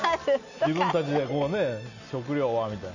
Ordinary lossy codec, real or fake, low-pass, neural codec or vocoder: none; real; 7.2 kHz; none